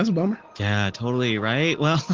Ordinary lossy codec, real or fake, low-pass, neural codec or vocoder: Opus, 16 kbps; real; 7.2 kHz; none